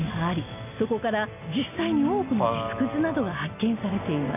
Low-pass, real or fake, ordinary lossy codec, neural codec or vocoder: 3.6 kHz; real; none; none